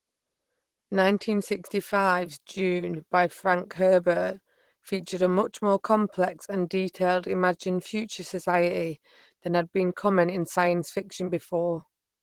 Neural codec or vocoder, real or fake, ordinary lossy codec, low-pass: vocoder, 44.1 kHz, 128 mel bands, Pupu-Vocoder; fake; Opus, 16 kbps; 19.8 kHz